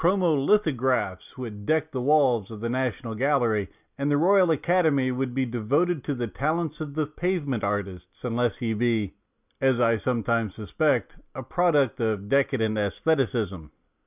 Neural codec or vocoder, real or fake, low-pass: none; real; 3.6 kHz